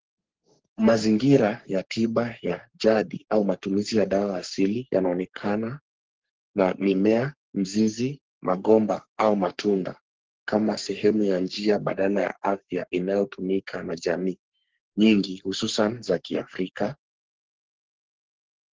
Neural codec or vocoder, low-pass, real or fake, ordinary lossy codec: codec, 44.1 kHz, 3.4 kbps, Pupu-Codec; 7.2 kHz; fake; Opus, 16 kbps